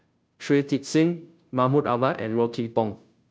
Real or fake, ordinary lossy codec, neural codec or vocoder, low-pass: fake; none; codec, 16 kHz, 0.5 kbps, FunCodec, trained on Chinese and English, 25 frames a second; none